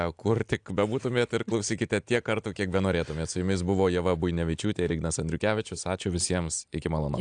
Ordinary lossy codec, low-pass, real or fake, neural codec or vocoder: AAC, 64 kbps; 9.9 kHz; real; none